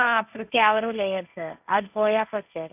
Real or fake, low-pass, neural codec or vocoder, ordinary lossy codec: fake; 3.6 kHz; codec, 16 kHz, 1.1 kbps, Voila-Tokenizer; none